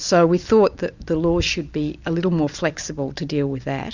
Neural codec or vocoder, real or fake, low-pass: none; real; 7.2 kHz